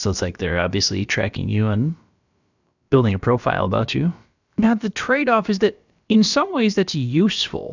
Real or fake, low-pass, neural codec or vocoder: fake; 7.2 kHz; codec, 16 kHz, about 1 kbps, DyCAST, with the encoder's durations